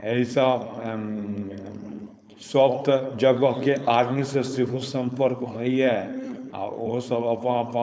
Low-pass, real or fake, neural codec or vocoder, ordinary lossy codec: none; fake; codec, 16 kHz, 4.8 kbps, FACodec; none